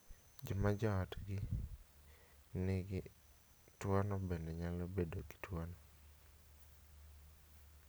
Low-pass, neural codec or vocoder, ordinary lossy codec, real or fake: none; none; none; real